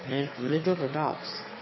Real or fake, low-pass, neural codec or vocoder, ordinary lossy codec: fake; 7.2 kHz; autoencoder, 22.05 kHz, a latent of 192 numbers a frame, VITS, trained on one speaker; MP3, 24 kbps